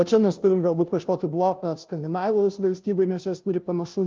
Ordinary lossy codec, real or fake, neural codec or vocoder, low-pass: Opus, 24 kbps; fake; codec, 16 kHz, 0.5 kbps, FunCodec, trained on Chinese and English, 25 frames a second; 7.2 kHz